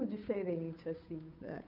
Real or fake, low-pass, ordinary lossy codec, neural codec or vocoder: fake; 5.4 kHz; none; codec, 16 kHz, 8 kbps, FunCodec, trained on Chinese and English, 25 frames a second